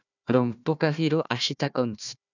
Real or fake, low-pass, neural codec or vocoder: fake; 7.2 kHz; codec, 16 kHz, 1 kbps, FunCodec, trained on Chinese and English, 50 frames a second